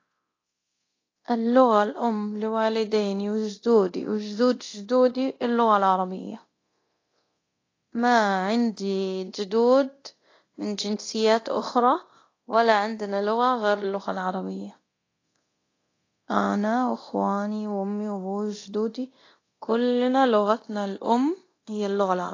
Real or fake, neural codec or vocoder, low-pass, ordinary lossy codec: fake; codec, 24 kHz, 0.9 kbps, DualCodec; 7.2 kHz; AAC, 32 kbps